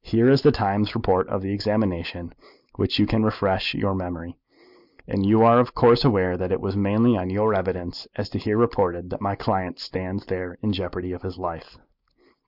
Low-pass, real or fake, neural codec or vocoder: 5.4 kHz; real; none